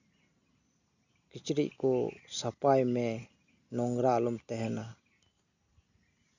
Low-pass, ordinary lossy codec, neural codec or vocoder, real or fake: 7.2 kHz; none; none; real